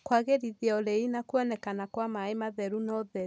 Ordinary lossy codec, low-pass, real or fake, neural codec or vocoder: none; none; real; none